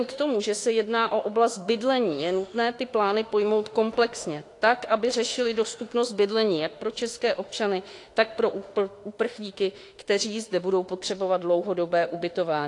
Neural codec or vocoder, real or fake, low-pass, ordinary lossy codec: autoencoder, 48 kHz, 32 numbers a frame, DAC-VAE, trained on Japanese speech; fake; 10.8 kHz; AAC, 48 kbps